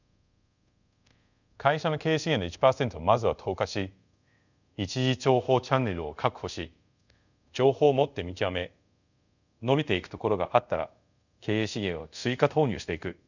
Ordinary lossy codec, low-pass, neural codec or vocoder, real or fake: none; 7.2 kHz; codec, 24 kHz, 0.5 kbps, DualCodec; fake